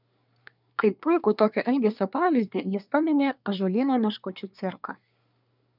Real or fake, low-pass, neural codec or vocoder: fake; 5.4 kHz; codec, 24 kHz, 1 kbps, SNAC